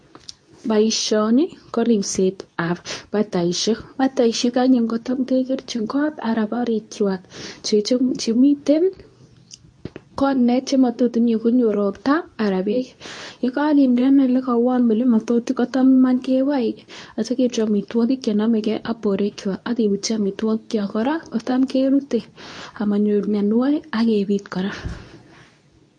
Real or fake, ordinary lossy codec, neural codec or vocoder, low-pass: fake; MP3, 48 kbps; codec, 24 kHz, 0.9 kbps, WavTokenizer, medium speech release version 2; 9.9 kHz